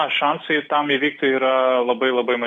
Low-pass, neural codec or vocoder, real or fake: 10.8 kHz; none; real